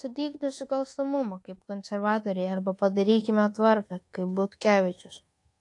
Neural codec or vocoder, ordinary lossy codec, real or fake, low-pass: codec, 24 kHz, 1.2 kbps, DualCodec; AAC, 48 kbps; fake; 10.8 kHz